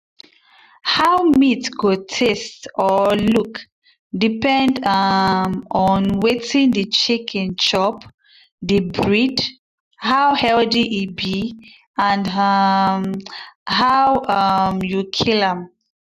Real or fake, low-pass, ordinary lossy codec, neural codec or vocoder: real; 14.4 kHz; none; none